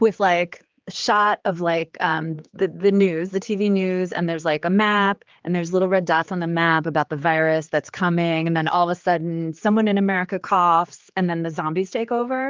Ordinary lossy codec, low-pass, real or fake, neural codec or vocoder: Opus, 24 kbps; 7.2 kHz; fake; codec, 16 kHz, 4 kbps, X-Codec, HuBERT features, trained on general audio